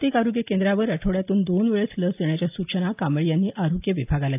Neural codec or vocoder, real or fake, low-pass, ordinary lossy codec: none; real; 3.6 kHz; AAC, 32 kbps